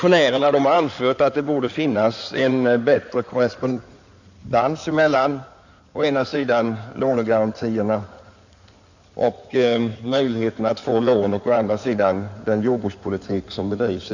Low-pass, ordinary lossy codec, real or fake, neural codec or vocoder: 7.2 kHz; none; fake; codec, 16 kHz in and 24 kHz out, 2.2 kbps, FireRedTTS-2 codec